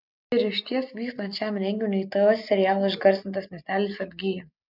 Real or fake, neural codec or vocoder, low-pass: real; none; 5.4 kHz